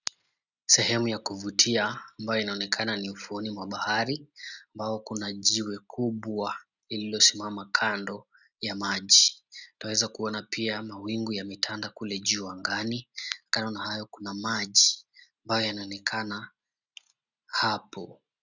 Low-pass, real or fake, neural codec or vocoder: 7.2 kHz; real; none